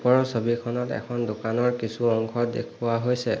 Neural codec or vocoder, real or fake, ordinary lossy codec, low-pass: none; real; none; none